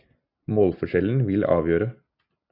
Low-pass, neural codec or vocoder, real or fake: 5.4 kHz; none; real